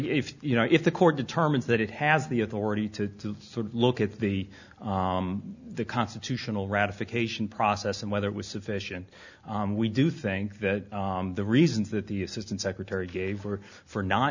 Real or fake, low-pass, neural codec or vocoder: real; 7.2 kHz; none